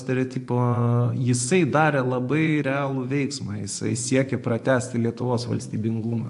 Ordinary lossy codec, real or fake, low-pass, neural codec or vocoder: AAC, 64 kbps; fake; 10.8 kHz; vocoder, 24 kHz, 100 mel bands, Vocos